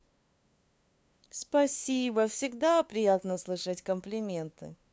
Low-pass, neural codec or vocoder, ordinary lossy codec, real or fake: none; codec, 16 kHz, 2 kbps, FunCodec, trained on LibriTTS, 25 frames a second; none; fake